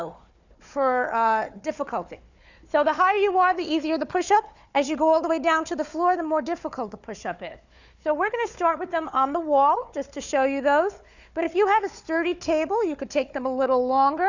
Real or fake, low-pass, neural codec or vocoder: fake; 7.2 kHz; codec, 16 kHz, 4 kbps, FunCodec, trained on Chinese and English, 50 frames a second